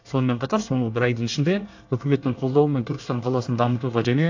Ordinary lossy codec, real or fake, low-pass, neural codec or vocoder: AAC, 48 kbps; fake; 7.2 kHz; codec, 24 kHz, 1 kbps, SNAC